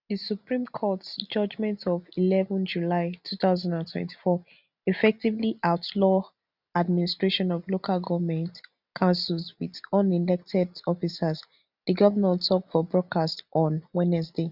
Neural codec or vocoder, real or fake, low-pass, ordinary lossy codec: none; real; 5.4 kHz; none